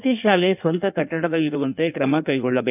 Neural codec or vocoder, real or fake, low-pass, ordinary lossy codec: codec, 16 kHz in and 24 kHz out, 1.1 kbps, FireRedTTS-2 codec; fake; 3.6 kHz; none